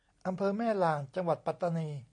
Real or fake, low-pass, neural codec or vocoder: real; 9.9 kHz; none